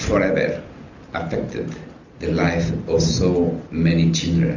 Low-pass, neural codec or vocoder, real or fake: 7.2 kHz; none; real